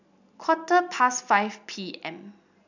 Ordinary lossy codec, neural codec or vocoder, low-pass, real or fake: none; none; 7.2 kHz; real